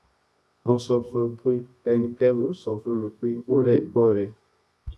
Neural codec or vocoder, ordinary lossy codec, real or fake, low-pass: codec, 24 kHz, 0.9 kbps, WavTokenizer, medium music audio release; none; fake; none